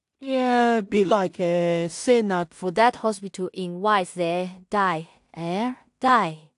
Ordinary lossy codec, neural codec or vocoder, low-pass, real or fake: AAC, 64 kbps; codec, 16 kHz in and 24 kHz out, 0.4 kbps, LongCat-Audio-Codec, two codebook decoder; 10.8 kHz; fake